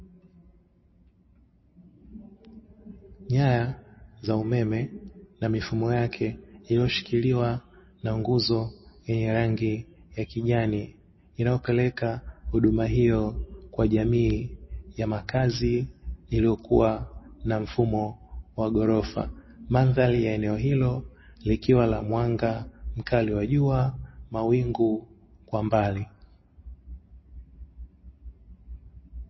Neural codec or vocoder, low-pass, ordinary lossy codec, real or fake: none; 7.2 kHz; MP3, 24 kbps; real